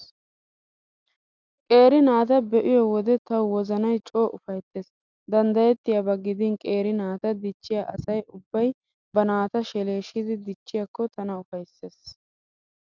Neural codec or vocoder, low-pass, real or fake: none; 7.2 kHz; real